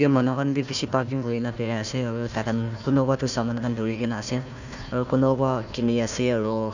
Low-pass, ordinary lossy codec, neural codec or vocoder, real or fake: 7.2 kHz; none; codec, 16 kHz, 1 kbps, FunCodec, trained on Chinese and English, 50 frames a second; fake